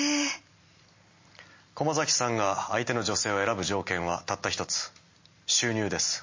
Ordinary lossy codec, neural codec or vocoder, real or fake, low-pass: MP3, 32 kbps; none; real; 7.2 kHz